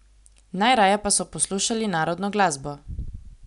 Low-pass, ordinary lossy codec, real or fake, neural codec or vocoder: 10.8 kHz; none; real; none